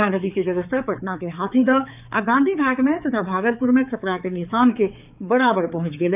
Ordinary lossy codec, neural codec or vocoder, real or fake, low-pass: none; codec, 16 kHz, 4 kbps, X-Codec, HuBERT features, trained on balanced general audio; fake; 3.6 kHz